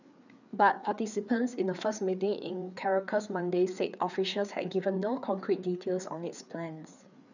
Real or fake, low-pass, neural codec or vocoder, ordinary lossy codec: fake; 7.2 kHz; codec, 16 kHz, 4 kbps, FreqCodec, larger model; none